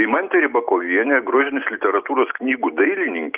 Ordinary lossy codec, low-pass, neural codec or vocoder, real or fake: Opus, 32 kbps; 3.6 kHz; none; real